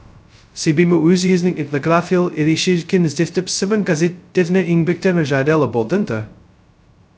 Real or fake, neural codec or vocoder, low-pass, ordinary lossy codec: fake; codec, 16 kHz, 0.2 kbps, FocalCodec; none; none